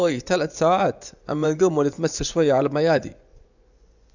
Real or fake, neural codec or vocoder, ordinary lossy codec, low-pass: fake; vocoder, 24 kHz, 100 mel bands, Vocos; none; 7.2 kHz